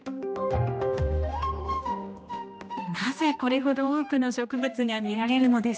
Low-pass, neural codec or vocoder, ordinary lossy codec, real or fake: none; codec, 16 kHz, 1 kbps, X-Codec, HuBERT features, trained on general audio; none; fake